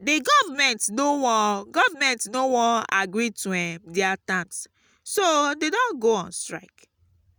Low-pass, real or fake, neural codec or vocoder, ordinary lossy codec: none; real; none; none